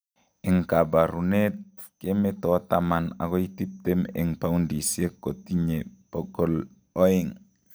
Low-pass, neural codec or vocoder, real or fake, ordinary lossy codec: none; none; real; none